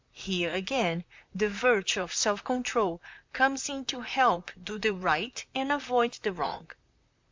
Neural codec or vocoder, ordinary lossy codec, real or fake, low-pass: vocoder, 44.1 kHz, 128 mel bands, Pupu-Vocoder; MP3, 64 kbps; fake; 7.2 kHz